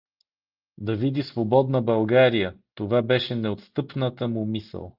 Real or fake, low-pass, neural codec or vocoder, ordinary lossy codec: real; 5.4 kHz; none; Opus, 32 kbps